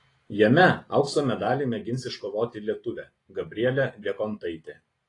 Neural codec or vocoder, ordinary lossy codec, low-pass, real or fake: none; AAC, 32 kbps; 10.8 kHz; real